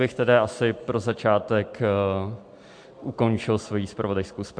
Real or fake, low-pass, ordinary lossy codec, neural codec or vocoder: real; 9.9 kHz; MP3, 64 kbps; none